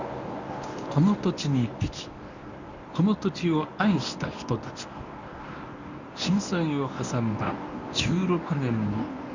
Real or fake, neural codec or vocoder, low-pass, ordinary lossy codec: fake; codec, 24 kHz, 0.9 kbps, WavTokenizer, medium speech release version 1; 7.2 kHz; none